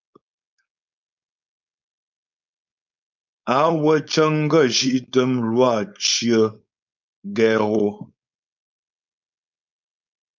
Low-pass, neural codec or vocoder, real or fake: 7.2 kHz; codec, 16 kHz, 4.8 kbps, FACodec; fake